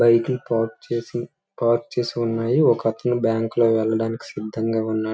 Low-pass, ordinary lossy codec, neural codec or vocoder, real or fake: none; none; none; real